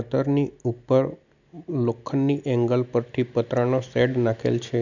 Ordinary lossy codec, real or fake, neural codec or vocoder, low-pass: none; real; none; 7.2 kHz